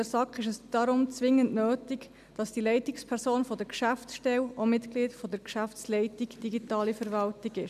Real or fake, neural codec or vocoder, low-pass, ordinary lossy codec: real; none; 14.4 kHz; none